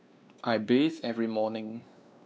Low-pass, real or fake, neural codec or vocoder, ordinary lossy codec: none; fake; codec, 16 kHz, 2 kbps, X-Codec, WavLM features, trained on Multilingual LibriSpeech; none